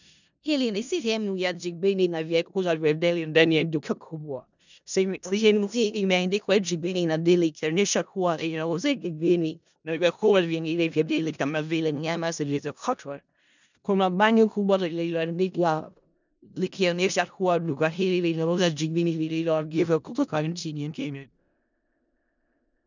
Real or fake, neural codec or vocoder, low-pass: fake; codec, 16 kHz in and 24 kHz out, 0.4 kbps, LongCat-Audio-Codec, four codebook decoder; 7.2 kHz